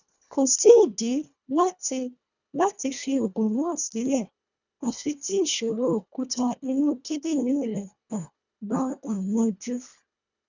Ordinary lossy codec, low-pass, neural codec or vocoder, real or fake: none; 7.2 kHz; codec, 24 kHz, 1.5 kbps, HILCodec; fake